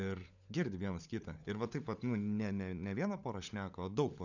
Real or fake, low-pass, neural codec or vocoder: fake; 7.2 kHz; codec, 16 kHz, 16 kbps, FunCodec, trained on LibriTTS, 50 frames a second